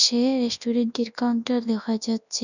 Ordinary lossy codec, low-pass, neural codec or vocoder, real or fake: none; 7.2 kHz; codec, 24 kHz, 0.9 kbps, WavTokenizer, large speech release; fake